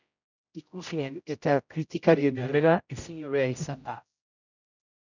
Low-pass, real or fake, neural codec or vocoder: 7.2 kHz; fake; codec, 16 kHz, 0.5 kbps, X-Codec, HuBERT features, trained on general audio